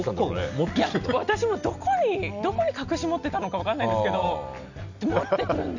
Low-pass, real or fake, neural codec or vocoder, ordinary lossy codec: 7.2 kHz; real; none; none